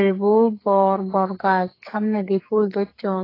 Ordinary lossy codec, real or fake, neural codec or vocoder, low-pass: AAC, 24 kbps; fake; codec, 44.1 kHz, 2.6 kbps, SNAC; 5.4 kHz